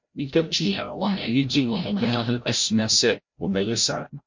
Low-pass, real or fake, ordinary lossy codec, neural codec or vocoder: 7.2 kHz; fake; MP3, 48 kbps; codec, 16 kHz, 0.5 kbps, FreqCodec, larger model